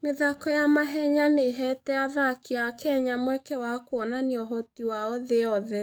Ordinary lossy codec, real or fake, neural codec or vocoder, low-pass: none; fake; codec, 44.1 kHz, 7.8 kbps, DAC; none